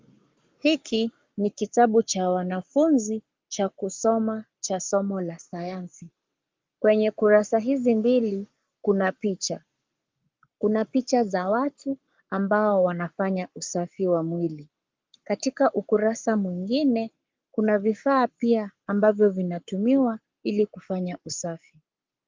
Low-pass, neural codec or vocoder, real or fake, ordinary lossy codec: 7.2 kHz; codec, 44.1 kHz, 7.8 kbps, Pupu-Codec; fake; Opus, 32 kbps